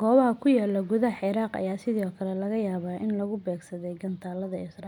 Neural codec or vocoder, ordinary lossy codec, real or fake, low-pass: none; none; real; 19.8 kHz